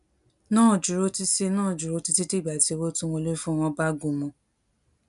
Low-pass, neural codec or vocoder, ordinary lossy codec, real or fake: 10.8 kHz; none; none; real